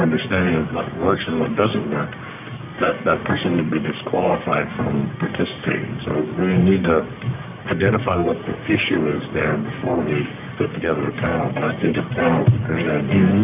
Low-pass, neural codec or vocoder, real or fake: 3.6 kHz; codec, 44.1 kHz, 1.7 kbps, Pupu-Codec; fake